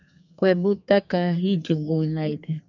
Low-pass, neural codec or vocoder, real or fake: 7.2 kHz; codec, 32 kHz, 1.9 kbps, SNAC; fake